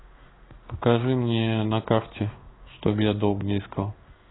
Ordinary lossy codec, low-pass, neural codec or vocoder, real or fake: AAC, 16 kbps; 7.2 kHz; codec, 16 kHz in and 24 kHz out, 1 kbps, XY-Tokenizer; fake